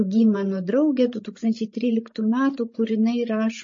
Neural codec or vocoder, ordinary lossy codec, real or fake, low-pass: codec, 16 kHz, 16 kbps, FreqCodec, larger model; MP3, 32 kbps; fake; 7.2 kHz